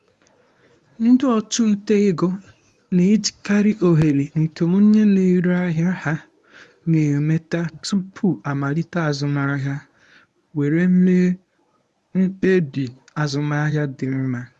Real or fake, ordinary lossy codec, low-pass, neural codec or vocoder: fake; none; none; codec, 24 kHz, 0.9 kbps, WavTokenizer, medium speech release version 1